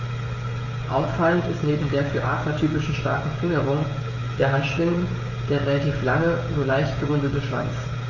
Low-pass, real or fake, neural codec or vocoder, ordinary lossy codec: 7.2 kHz; fake; codec, 16 kHz, 16 kbps, FreqCodec, smaller model; MP3, 32 kbps